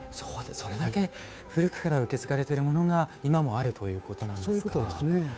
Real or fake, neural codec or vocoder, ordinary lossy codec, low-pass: fake; codec, 16 kHz, 2 kbps, FunCodec, trained on Chinese and English, 25 frames a second; none; none